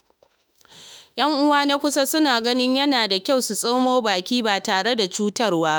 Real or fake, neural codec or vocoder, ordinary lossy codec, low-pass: fake; autoencoder, 48 kHz, 32 numbers a frame, DAC-VAE, trained on Japanese speech; none; none